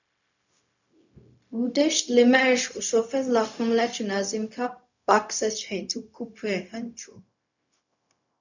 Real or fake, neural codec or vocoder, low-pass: fake; codec, 16 kHz, 0.4 kbps, LongCat-Audio-Codec; 7.2 kHz